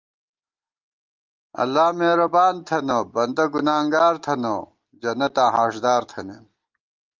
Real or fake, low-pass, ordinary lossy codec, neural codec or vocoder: real; 7.2 kHz; Opus, 32 kbps; none